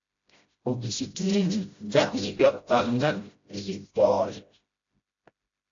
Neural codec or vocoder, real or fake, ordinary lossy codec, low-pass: codec, 16 kHz, 0.5 kbps, FreqCodec, smaller model; fake; AAC, 48 kbps; 7.2 kHz